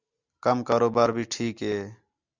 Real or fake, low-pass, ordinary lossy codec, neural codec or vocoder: real; 7.2 kHz; Opus, 64 kbps; none